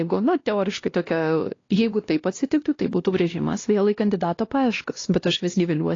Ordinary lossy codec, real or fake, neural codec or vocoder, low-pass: AAC, 32 kbps; fake; codec, 16 kHz, 1 kbps, X-Codec, WavLM features, trained on Multilingual LibriSpeech; 7.2 kHz